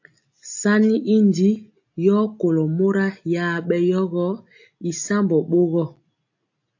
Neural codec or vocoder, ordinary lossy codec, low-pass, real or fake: none; AAC, 48 kbps; 7.2 kHz; real